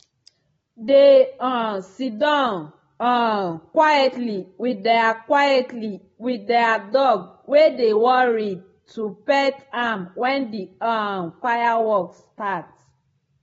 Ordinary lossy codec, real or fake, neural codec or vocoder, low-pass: AAC, 24 kbps; real; none; 19.8 kHz